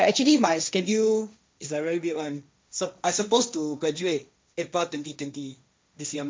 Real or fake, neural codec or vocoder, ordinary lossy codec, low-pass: fake; codec, 16 kHz, 1.1 kbps, Voila-Tokenizer; none; none